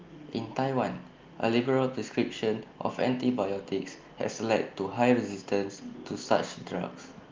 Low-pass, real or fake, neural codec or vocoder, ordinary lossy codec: 7.2 kHz; real; none; Opus, 32 kbps